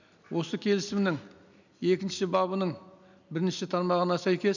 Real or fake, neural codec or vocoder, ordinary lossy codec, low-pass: real; none; none; 7.2 kHz